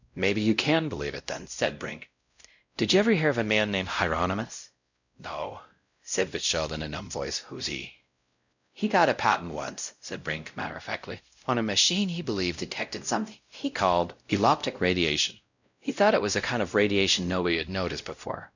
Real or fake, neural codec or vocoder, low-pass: fake; codec, 16 kHz, 0.5 kbps, X-Codec, WavLM features, trained on Multilingual LibriSpeech; 7.2 kHz